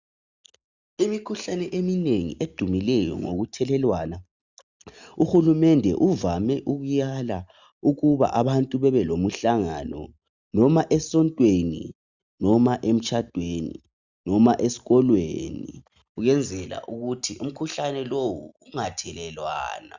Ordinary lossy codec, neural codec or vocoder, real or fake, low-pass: Opus, 64 kbps; none; real; 7.2 kHz